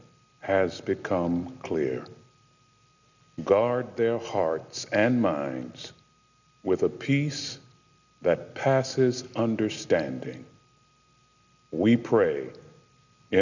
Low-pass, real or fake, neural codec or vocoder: 7.2 kHz; real; none